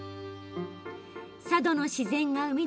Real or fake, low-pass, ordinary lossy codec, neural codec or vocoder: real; none; none; none